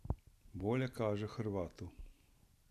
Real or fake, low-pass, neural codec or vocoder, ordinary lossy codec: real; 14.4 kHz; none; none